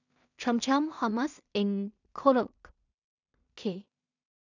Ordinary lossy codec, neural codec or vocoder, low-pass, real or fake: none; codec, 16 kHz in and 24 kHz out, 0.4 kbps, LongCat-Audio-Codec, two codebook decoder; 7.2 kHz; fake